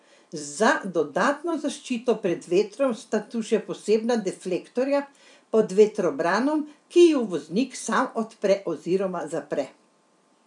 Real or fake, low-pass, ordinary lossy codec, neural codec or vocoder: fake; 10.8 kHz; none; vocoder, 44.1 kHz, 128 mel bands every 256 samples, BigVGAN v2